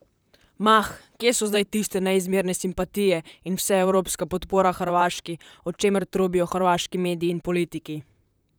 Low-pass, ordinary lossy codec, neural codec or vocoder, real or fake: none; none; vocoder, 44.1 kHz, 128 mel bands, Pupu-Vocoder; fake